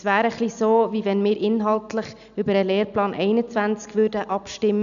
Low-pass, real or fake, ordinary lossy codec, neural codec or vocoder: 7.2 kHz; real; none; none